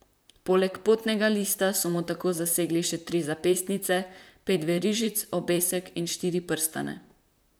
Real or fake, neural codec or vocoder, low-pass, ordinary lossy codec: fake; vocoder, 44.1 kHz, 128 mel bands, Pupu-Vocoder; none; none